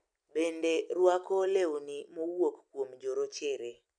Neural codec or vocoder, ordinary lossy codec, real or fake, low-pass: none; none; real; 9.9 kHz